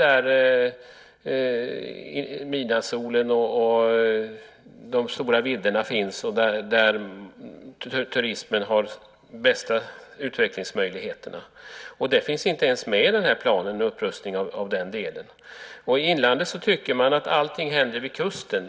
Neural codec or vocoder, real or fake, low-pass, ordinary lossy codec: none; real; none; none